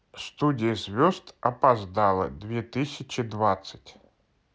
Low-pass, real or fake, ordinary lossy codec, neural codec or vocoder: none; real; none; none